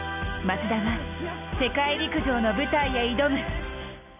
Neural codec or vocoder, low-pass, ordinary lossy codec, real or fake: none; 3.6 kHz; none; real